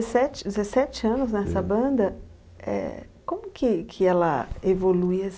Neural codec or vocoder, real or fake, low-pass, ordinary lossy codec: none; real; none; none